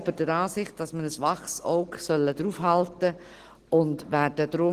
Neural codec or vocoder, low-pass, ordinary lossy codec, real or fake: none; 14.4 kHz; Opus, 16 kbps; real